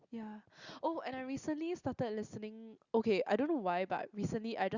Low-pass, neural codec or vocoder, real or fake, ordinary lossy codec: 7.2 kHz; none; real; Opus, 64 kbps